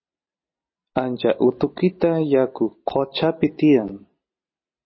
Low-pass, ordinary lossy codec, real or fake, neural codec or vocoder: 7.2 kHz; MP3, 24 kbps; real; none